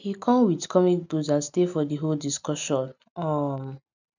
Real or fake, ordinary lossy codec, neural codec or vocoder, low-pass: real; none; none; 7.2 kHz